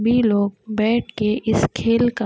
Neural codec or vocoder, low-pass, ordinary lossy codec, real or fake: none; none; none; real